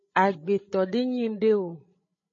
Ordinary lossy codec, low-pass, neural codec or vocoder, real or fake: MP3, 32 kbps; 7.2 kHz; codec, 16 kHz, 16 kbps, FreqCodec, larger model; fake